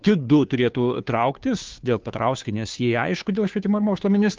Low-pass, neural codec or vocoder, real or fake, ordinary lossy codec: 7.2 kHz; codec, 16 kHz, 2 kbps, FunCodec, trained on Chinese and English, 25 frames a second; fake; Opus, 24 kbps